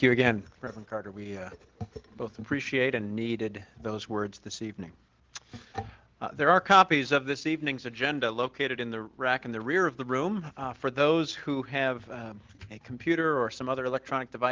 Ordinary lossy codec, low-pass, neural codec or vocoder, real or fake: Opus, 16 kbps; 7.2 kHz; none; real